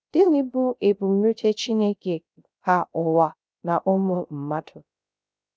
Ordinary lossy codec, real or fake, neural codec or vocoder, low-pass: none; fake; codec, 16 kHz, 0.3 kbps, FocalCodec; none